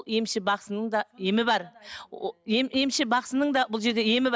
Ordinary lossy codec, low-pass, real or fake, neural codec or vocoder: none; none; real; none